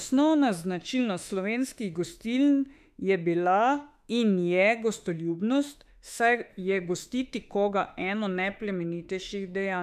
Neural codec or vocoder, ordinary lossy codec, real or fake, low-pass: autoencoder, 48 kHz, 32 numbers a frame, DAC-VAE, trained on Japanese speech; none; fake; 14.4 kHz